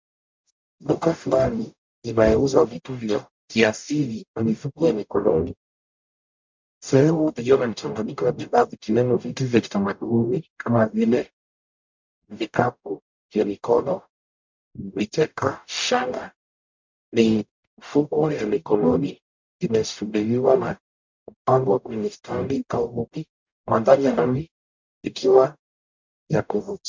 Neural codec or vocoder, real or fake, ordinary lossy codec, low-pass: codec, 44.1 kHz, 0.9 kbps, DAC; fake; MP3, 64 kbps; 7.2 kHz